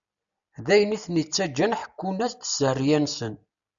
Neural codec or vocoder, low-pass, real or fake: none; 7.2 kHz; real